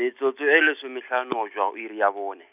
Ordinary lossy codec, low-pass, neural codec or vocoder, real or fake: none; 3.6 kHz; none; real